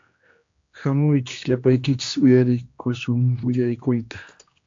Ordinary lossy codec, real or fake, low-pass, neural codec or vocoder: AAC, 48 kbps; fake; 7.2 kHz; codec, 16 kHz, 1 kbps, X-Codec, HuBERT features, trained on general audio